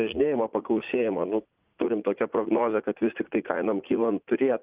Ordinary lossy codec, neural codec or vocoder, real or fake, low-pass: Opus, 32 kbps; vocoder, 44.1 kHz, 80 mel bands, Vocos; fake; 3.6 kHz